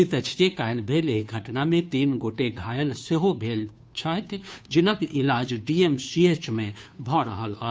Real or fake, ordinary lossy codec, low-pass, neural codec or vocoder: fake; none; none; codec, 16 kHz, 2 kbps, FunCodec, trained on Chinese and English, 25 frames a second